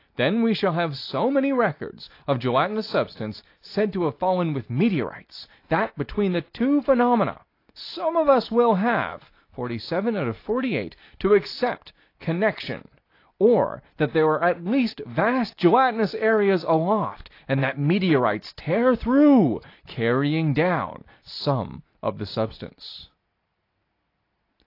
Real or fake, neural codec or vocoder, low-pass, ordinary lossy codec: real; none; 5.4 kHz; AAC, 32 kbps